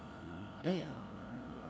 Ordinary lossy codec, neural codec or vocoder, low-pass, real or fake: none; codec, 16 kHz, 0.5 kbps, FunCodec, trained on LibriTTS, 25 frames a second; none; fake